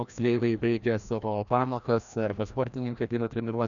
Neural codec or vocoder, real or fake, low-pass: codec, 16 kHz, 1 kbps, FreqCodec, larger model; fake; 7.2 kHz